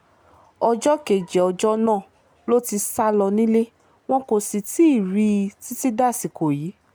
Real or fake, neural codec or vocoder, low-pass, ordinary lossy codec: real; none; none; none